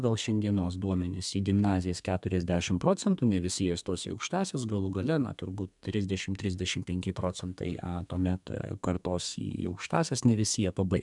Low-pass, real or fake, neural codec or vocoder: 10.8 kHz; fake; codec, 32 kHz, 1.9 kbps, SNAC